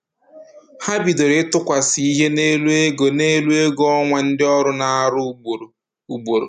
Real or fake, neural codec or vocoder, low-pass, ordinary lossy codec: real; none; 14.4 kHz; none